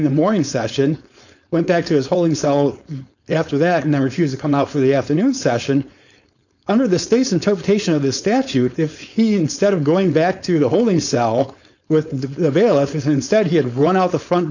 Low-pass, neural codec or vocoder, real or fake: 7.2 kHz; codec, 16 kHz, 4.8 kbps, FACodec; fake